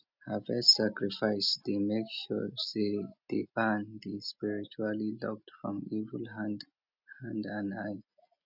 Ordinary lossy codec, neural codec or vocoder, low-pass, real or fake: none; none; 5.4 kHz; real